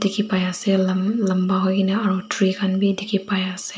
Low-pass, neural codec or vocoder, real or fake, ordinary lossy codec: none; none; real; none